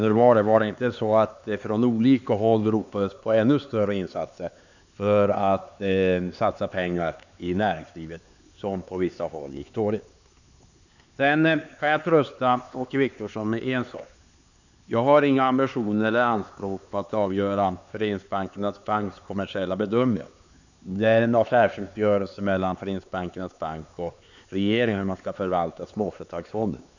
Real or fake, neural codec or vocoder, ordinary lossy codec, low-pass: fake; codec, 16 kHz, 4 kbps, X-Codec, HuBERT features, trained on LibriSpeech; none; 7.2 kHz